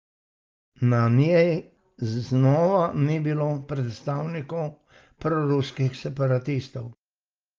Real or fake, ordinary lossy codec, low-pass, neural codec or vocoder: real; Opus, 32 kbps; 7.2 kHz; none